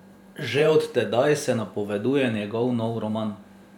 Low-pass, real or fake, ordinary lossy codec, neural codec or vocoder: 19.8 kHz; fake; none; vocoder, 44.1 kHz, 128 mel bands every 512 samples, BigVGAN v2